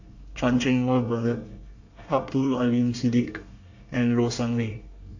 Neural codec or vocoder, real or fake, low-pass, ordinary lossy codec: codec, 24 kHz, 1 kbps, SNAC; fake; 7.2 kHz; AAC, 48 kbps